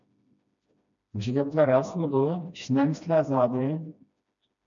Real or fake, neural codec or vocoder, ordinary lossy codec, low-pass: fake; codec, 16 kHz, 1 kbps, FreqCodec, smaller model; MP3, 64 kbps; 7.2 kHz